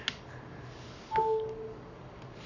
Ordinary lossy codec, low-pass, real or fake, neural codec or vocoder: none; 7.2 kHz; fake; codec, 44.1 kHz, 7.8 kbps, Pupu-Codec